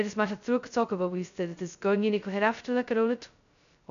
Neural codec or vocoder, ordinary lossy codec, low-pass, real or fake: codec, 16 kHz, 0.2 kbps, FocalCodec; none; 7.2 kHz; fake